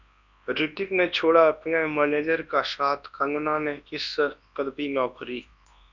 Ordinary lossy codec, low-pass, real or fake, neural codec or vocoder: Opus, 64 kbps; 7.2 kHz; fake; codec, 24 kHz, 0.9 kbps, WavTokenizer, large speech release